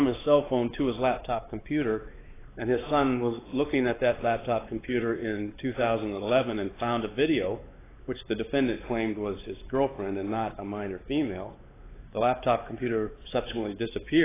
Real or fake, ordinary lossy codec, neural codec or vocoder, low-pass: fake; AAC, 16 kbps; codec, 16 kHz, 4 kbps, X-Codec, WavLM features, trained on Multilingual LibriSpeech; 3.6 kHz